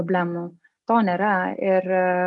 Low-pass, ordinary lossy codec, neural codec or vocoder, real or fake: 10.8 kHz; MP3, 96 kbps; none; real